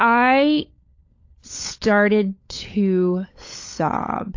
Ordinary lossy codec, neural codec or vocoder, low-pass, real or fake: AAC, 48 kbps; codec, 16 kHz, 16 kbps, FunCodec, trained on LibriTTS, 50 frames a second; 7.2 kHz; fake